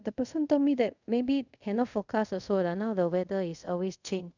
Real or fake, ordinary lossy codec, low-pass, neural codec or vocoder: fake; none; 7.2 kHz; codec, 24 kHz, 0.5 kbps, DualCodec